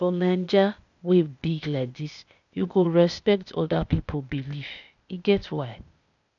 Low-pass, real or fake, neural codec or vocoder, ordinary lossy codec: 7.2 kHz; fake; codec, 16 kHz, 0.8 kbps, ZipCodec; none